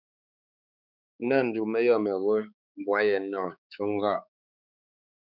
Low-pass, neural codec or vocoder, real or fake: 5.4 kHz; codec, 16 kHz, 4 kbps, X-Codec, HuBERT features, trained on balanced general audio; fake